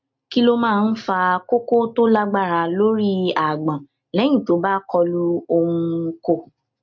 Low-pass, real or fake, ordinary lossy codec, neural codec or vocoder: 7.2 kHz; real; MP3, 48 kbps; none